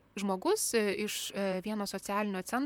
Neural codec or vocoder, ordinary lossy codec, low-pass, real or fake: vocoder, 44.1 kHz, 128 mel bands, Pupu-Vocoder; MP3, 96 kbps; 19.8 kHz; fake